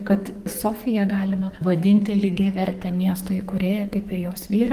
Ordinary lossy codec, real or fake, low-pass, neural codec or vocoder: Opus, 24 kbps; fake; 14.4 kHz; codec, 44.1 kHz, 2.6 kbps, SNAC